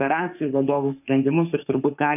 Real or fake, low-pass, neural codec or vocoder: fake; 3.6 kHz; autoencoder, 48 kHz, 32 numbers a frame, DAC-VAE, trained on Japanese speech